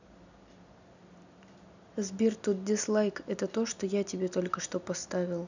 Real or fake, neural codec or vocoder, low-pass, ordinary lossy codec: real; none; 7.2 kHz; none